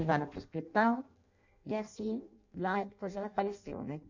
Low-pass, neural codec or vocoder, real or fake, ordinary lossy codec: 7.2 kHz; codec, 16 kHz in and 24 kHz out, 0.6 kbps, FireRedTTS-2 codec; fake; none